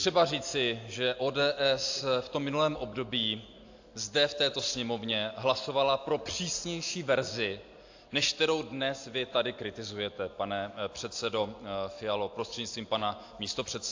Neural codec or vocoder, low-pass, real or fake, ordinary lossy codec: none; 7.2 kHz; real; AAC, 48 kbps